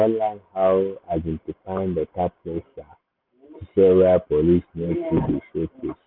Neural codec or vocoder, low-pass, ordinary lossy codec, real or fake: none; 5.4 kHz; none; real